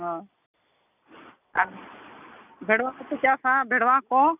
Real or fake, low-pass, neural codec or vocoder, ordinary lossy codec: real; 3.6 kHz; none; none